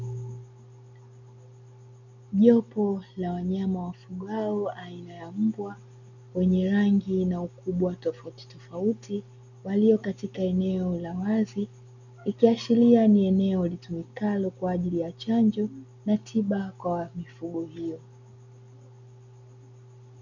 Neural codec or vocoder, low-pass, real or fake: none; 7.2 kHz; real